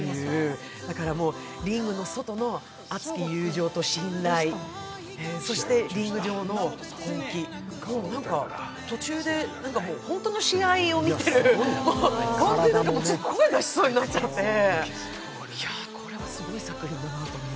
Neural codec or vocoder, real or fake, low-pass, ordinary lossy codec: none; real; none; none